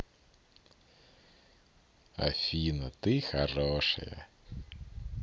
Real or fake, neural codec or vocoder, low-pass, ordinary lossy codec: real; none; none; none